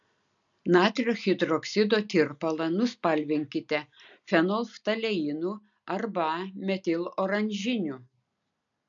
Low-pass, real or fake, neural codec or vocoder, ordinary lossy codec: 7.2 kHz; real; none; MP3, 96 kbps